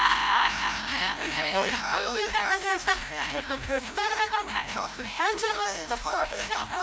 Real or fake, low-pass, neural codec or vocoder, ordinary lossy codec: fake; none; codec, 16 kHz, 0.5 kbps, FreqCodec, larger model; none